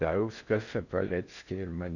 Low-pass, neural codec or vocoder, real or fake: 7.2 kHz; codec, 16 kHz in and 24 kHz out, 0.6 kbps, FocalCodec, streaming, 4096 codes; fake